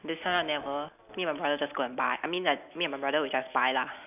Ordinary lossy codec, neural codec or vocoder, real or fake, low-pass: none; none; real; 3.6 kHz